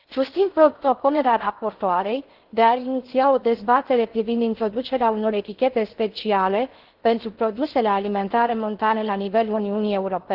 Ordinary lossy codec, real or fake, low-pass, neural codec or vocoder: Opus, 16 kbps; fake; 5.4 kHz; codec, 16 kHz in and 24 kHz out, 0.6 kbps, FocalCodec, streaming, 4096 codes